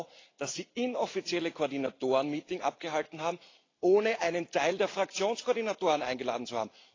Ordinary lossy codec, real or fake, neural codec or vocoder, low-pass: AAC, 32 kbps; real; none; 7.2 kHz